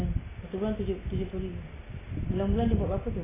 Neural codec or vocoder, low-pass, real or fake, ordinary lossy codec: none; 3.6 kHz; real; AAC, 16 kbps